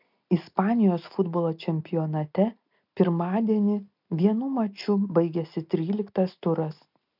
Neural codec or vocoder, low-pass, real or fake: none; 5.4 kHz; real